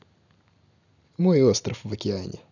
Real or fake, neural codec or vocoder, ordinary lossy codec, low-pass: real; none; none; 7.2 kHz